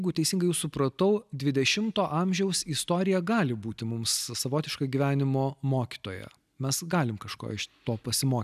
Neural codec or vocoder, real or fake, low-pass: none; real; 14.4 kHz